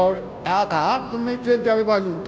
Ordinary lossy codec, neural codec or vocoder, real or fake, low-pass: none; codec, 16 kHz, 0.5 kbps, FunCodec, trained on Chinese and English, 25 frames a second; fake; none